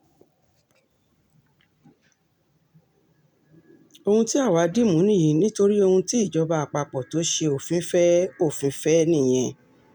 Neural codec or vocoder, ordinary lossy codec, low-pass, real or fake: none; none; 19.8 kHz; real